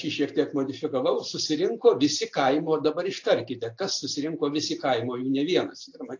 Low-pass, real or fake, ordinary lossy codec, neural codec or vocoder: 7.2 kHz; real; AAC, 48 kbps; none